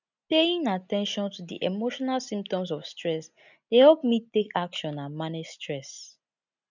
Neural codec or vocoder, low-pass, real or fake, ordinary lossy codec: none; 7.2 kHz; real; none